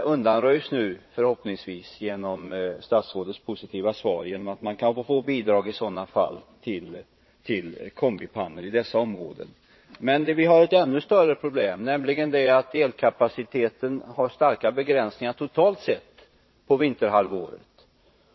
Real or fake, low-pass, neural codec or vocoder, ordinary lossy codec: fake; 7.2 kHz; vocoder, 22.05 kHz, 80 mel bands, Vocos; MP3, 24 kbps